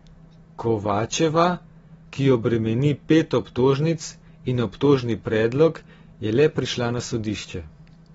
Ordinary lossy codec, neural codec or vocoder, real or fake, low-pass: AAC, 24 kbps; none; real; 19.8 kHz